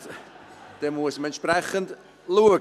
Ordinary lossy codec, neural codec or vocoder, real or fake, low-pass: none; none; real; 14.4 kHz